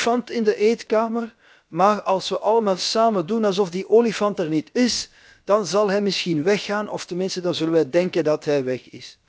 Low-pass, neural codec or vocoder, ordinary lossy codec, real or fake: none; codec, 16 kHz, about 1 kbps, DyCAST, with the encoder's durations; none; fake